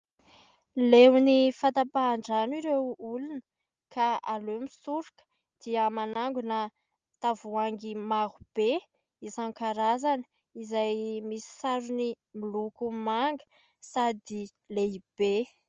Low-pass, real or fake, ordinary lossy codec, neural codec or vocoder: 7.2 kHz; real; Opus, 24 kbps; none